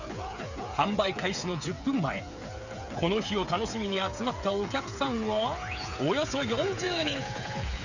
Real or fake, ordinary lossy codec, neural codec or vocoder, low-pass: fake; none; codec, 16 kHz, 8 kbps, FreqCodec, smaller model; 7.2 kHz